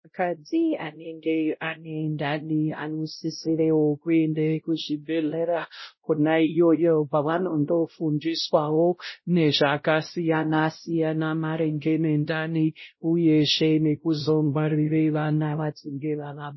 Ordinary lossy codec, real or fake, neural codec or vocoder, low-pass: MP3, 24 kbps; fake; codec, 16 kHz, 0.5 kbps, X-Codec, WavLM features, trained on Multilingual LibriSpeech; 7.2 kHz